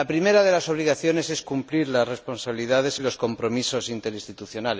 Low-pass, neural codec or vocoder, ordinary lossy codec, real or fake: none; none; none; real